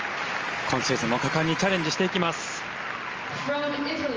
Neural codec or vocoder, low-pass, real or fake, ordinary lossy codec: none; 7.2 kHz; real; Opus, 24 kbps